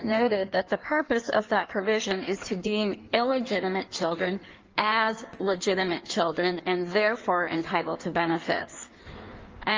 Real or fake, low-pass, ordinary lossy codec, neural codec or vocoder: fake; 7.2 kHz; Opus, 24 kbps; codec, 16 kHz in and 24 kHz out, 1.1 kbps, FireRedTTS-2 codec